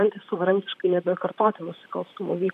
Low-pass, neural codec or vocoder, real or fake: 14.4 kHz; vocoder, 44.1 kHz, 128 mel bands, Pupu-Vocoder; fake